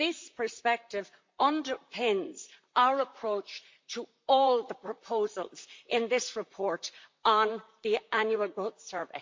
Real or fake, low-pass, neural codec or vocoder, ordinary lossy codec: fake; 7.2 kHz; vocoder, 44.1 kHz, 128 mel bands, Pupu-Vocoder; MP3, 48 kbps